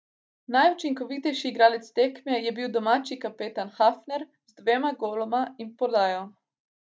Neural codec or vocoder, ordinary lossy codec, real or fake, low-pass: none; none; real; none